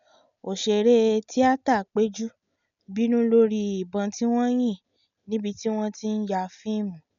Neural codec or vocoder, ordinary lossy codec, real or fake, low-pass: none; none; real; 7.2 kHz